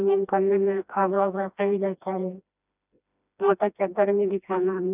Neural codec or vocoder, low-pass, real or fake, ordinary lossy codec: codec, 16 kHz, 1 kbps, FreqCodec, smaller model; 3.6 kHz; fake; none